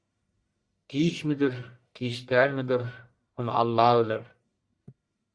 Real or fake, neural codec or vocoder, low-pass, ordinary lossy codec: fake; codec, 44.1 kHz, 1.7 kbps, Pupu-Codec; 9.9 kHz; Opus, 64 kbps